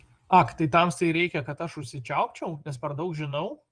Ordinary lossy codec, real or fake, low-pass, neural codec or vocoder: Opus, 24 kbps; fake; 9.9 kHz; vocoder, 24 kHz, 100 mel bands, Vocos